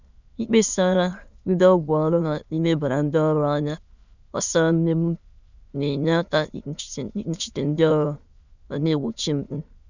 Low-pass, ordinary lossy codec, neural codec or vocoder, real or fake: 7.2 kHz; none; autoencoder, 22.05 kHz, a latent of 192 numbers a frame, VITS, trained on many speakers; fake